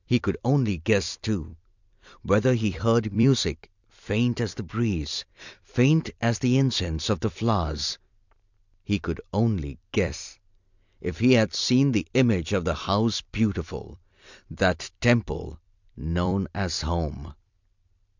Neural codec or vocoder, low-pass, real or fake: vocoder, 44.1 kHz, 128 mel bands every 256 samples, BigVGAN v2; 7.2 kHz; fake